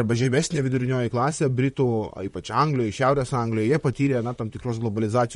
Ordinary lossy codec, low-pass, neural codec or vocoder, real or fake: MP3, 48 kbps; 19.8 kHz; vocoder, 44.1 kHz, 128 mel bands, Pupu-Vocoder; fake